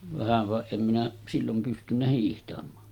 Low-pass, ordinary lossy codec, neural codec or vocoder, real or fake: 19.8 kHz; Opus, 32 kbps; none; real